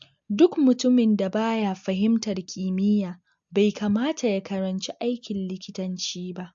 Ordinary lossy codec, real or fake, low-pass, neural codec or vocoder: MP3, 48 kbps; real; 7.2 kHz; none